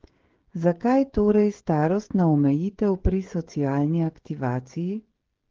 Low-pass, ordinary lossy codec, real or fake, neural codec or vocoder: 7.2 kHz; Opus, 16 kbps; fake; codec, 16 kHz, 16 kbps, FreqCodec, smaller model